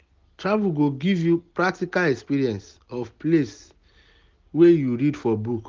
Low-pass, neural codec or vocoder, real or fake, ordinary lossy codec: 7.2 kHz; none; real; Opus, 32 kbps